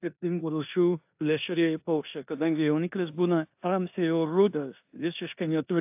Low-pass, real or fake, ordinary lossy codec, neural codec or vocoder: 3.6 kHz; fake; AAC, 32 kbps; codec, 16 kHz in and 24 kHz out, 0.9 kbps, LongCat-Audio-Codec, four codebook decoder